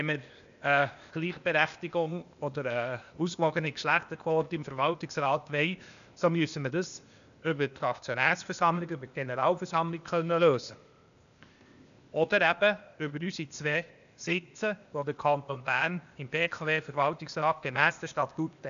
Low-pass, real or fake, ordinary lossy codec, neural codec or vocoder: 7.2 kHz; fake; none; codec, 16 kHz, 0.8 kbps, ZipCodec